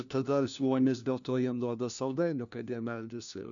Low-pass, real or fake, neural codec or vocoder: 7.2 kHz; fake; codec, 16 kHz, 1 kbps, FunCodec, trained on LibriTTS, 50 frames a second